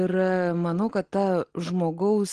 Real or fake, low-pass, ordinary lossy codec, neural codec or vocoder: real; 9.9 kHz; Opus, 16 kbps; none